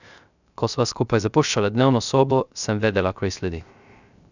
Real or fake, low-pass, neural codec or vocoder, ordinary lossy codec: fake; 7.2 kHz; codec, 16 kHz, 0.3 kbps, FocalCodec; none